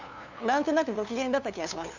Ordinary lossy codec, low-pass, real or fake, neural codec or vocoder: none; 7.2 kHz; fake; codec, 16 kHz, 2 kbps, FunCodec, trained on LibriTTS, 25 frames a second